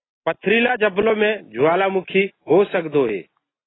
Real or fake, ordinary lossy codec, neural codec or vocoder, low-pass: real; AAC, 16 kbps; none; 7.2 kHz